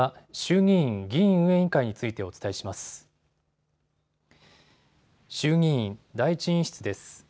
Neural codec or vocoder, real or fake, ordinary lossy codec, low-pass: none; real; none; none